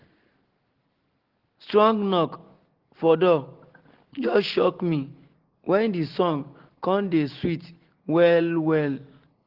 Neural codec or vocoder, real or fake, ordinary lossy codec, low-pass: none; real; Opus, 16 kbps; 5.4 kHz